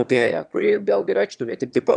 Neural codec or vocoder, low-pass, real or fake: autoencoder, 22.05 kHz, a latent of 192 numbers a frame, VITS, trained on one speaker; 9.9 kHz; fake